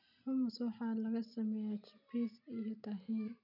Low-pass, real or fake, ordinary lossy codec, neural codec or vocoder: 5.4 kHz; real; none; none